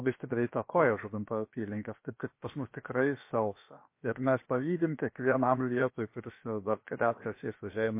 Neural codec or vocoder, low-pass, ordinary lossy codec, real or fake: codec, 16 kHz, about 1 kbps, DyCAST, with the encoder's durations; 3.6 kHz; MP3, 24 kbps; fake